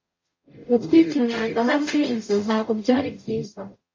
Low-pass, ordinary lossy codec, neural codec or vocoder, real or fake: 7.2 kHz; MP3, 32 kbps; codec, 44.1 kHz, 0.9 kbps, DAC; fake